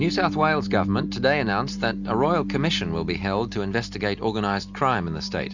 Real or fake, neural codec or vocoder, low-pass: real; none; 7.2 kHz